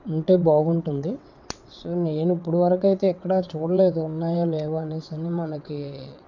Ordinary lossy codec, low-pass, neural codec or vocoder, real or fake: none; 7.2 kHz; vocoder, 22.05 kHz, 80 mel bands, WaveNeXt; fake